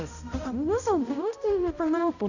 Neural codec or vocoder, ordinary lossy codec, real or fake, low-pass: codec, 16 kHz, 0.5 kbps, X-Codec, HuBERT features, trained on balanced general audio; none; fake; 7.2 kHz